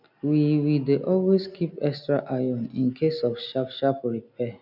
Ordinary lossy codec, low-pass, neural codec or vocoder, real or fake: none; 5.4 kHz; none; real